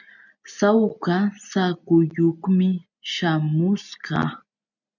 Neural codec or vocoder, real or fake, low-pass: none; real; 7.2 kHz